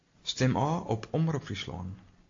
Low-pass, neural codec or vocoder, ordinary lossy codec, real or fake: 7.2 kHz; none; AAC, 48 kbps; real